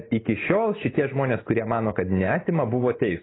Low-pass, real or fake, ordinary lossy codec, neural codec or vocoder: 7.2 kHz; real; AAC, 16 kbps; none